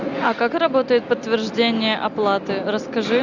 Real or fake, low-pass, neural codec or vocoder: real; 7.2 kHz; none